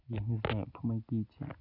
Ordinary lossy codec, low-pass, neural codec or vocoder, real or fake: none; 5.4 kHz; none; real